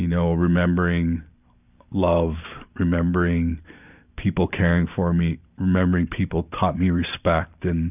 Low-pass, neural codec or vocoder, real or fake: 3.6 kHz; vocoder, 44.1 kHz, 128 mel bands every 512 samples, BigVGAN v2; fake